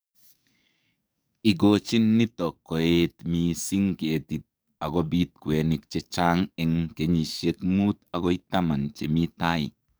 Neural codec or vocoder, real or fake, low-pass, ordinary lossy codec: codec, 44.1 kHz, 7.8 kbps, DAC; fake; none; none